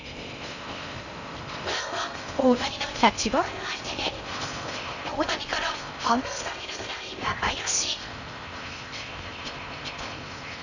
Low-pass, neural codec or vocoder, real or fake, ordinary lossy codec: 7.2 kHz; codec, 16 kHz in and 24 kHz out, 0.6 kbps, FocalCodec, streaming, 4096 codes; fake; none